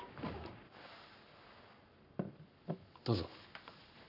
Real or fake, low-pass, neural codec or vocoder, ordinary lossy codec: fake; 5.4 kHz; codec, 44.1 kHz, 7.8 kbps, Pupu-Codec; none